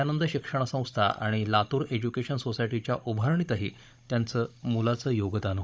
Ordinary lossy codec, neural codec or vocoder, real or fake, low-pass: none; codec, 16 kHz, 16 kbps, FunCodec, trained on Chinese and English, 50 frames a second; fake; none